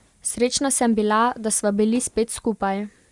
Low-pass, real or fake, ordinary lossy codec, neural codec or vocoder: 10.8 kHz; real; Opus, 64 kbps; none